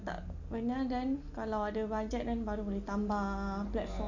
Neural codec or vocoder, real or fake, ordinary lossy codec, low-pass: none; real; none; 7.2 kHz